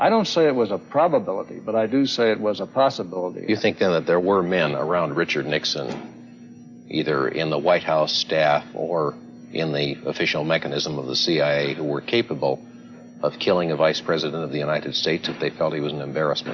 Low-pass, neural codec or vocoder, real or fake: 7.2 kHz; none; real